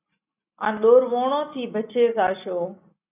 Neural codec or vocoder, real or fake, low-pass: none; real; 3.6 kHz